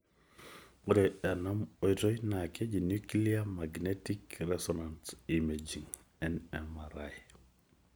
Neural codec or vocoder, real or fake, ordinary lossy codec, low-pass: none; real; none; none